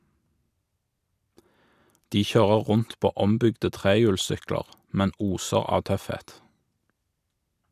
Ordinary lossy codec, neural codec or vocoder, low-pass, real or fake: none; none; 14.4 kHz; real